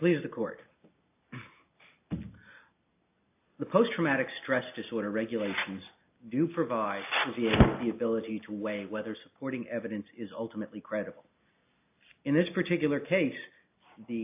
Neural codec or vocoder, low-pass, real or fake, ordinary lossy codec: none; 3.6 kHz; real; AAC, 32 kbps